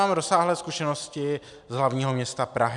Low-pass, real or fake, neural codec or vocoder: 10.8 kHz; real; none